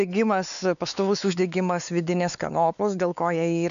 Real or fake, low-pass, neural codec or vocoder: fake; 7.2 kHz; codec, 16 kHz, 4 kbps, X-Codec, WavLM features, trained on Multilingual LibriSpeech